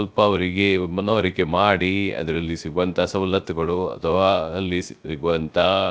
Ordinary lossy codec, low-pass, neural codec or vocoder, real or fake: none; none; codec, 16 kHz, 0.3 kbps, FocalCodec; fake